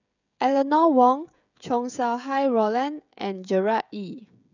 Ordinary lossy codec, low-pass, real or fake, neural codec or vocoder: none; 7.2 kHz; fake; codec, 16 kHz, 16 kbps, FreqCodec, smaller model